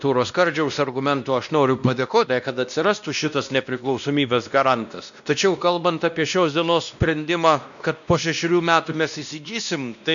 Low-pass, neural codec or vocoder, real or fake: 7.2 kHz; codec, 16 kHz, 1 kbps, X-Codec, WavLM features, trained on Multilingual LibriSpeech; fake